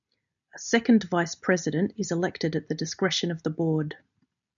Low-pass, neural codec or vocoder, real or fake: 7.2 kHz; none; real